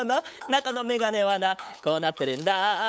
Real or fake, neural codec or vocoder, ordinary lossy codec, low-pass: fake; codec, 16 kHz, 8 kbps, FunCodec, trained on LibriTTS, 25 frames a second; none; none